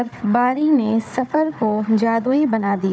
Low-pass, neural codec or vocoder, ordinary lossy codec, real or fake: none; codec, 16 kHz, 4 kbps, FunCodec, trained on Chinese and English, 50 frames a second; none; fake